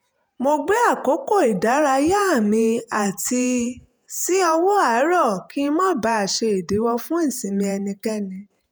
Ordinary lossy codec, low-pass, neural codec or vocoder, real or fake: none; none; vocoder, 48 kHz, 128 mel bands, Vocos; fake